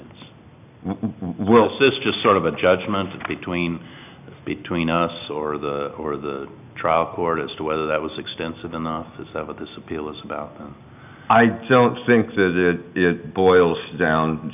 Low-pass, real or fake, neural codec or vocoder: 3.6 kHz; real; none